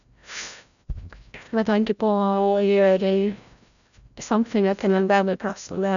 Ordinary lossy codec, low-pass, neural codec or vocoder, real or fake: none; 7.2 kHz; codec, 16 kHz, 0.5 kbps, FreqCodec, larger model; fake